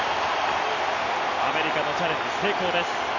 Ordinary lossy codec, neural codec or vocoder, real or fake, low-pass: none; none; real; 7.2 kHz